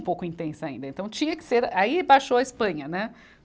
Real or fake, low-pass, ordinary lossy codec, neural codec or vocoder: real; none; none; none